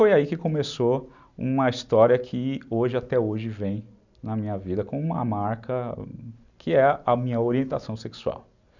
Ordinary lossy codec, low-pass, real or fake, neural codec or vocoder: none; 7.2 kHz; real; none